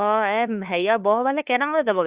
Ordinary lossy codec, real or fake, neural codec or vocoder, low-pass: Opus, 64 kbps; fake; codec, 16 kHz, 2 kbps, FunCodec, trained on LibriTTS, 25 frames a second; 3.6 kHz